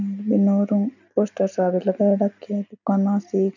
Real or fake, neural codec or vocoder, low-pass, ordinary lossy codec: real; none; 7.2 kHz; none